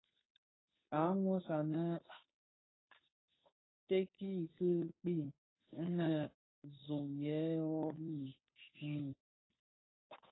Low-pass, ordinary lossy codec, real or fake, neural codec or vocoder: 7.2 kHz; AAC, 16 kbps; fake; codec, 16 kHz, 2 kbps, FunCodec, trained on Chinese and English, 25 frames a second